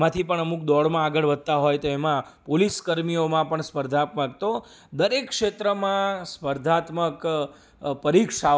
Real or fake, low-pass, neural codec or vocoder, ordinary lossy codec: real; none; none; none